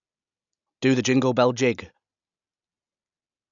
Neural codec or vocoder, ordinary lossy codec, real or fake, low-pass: none; none; real; 7.2 kHz